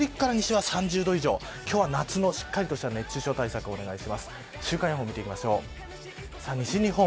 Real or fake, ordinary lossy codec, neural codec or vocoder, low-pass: real; none; none; none